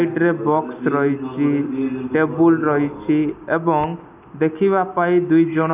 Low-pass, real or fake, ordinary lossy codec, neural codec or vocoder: 3.6 kHz; real; none; none